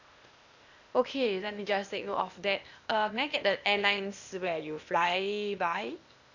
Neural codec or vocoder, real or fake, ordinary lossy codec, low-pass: codec, 16 kHz, 0.8 kbps, ZipCodec; fake; none; 7.2 kHz